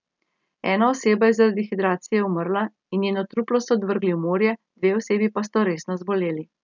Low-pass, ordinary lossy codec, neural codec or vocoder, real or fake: 7.2 kHz; none; none; real